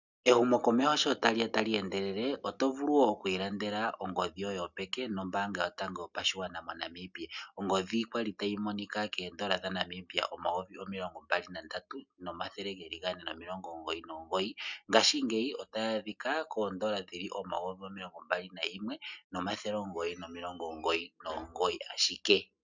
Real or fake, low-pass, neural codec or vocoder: real; 7.2 kHz; none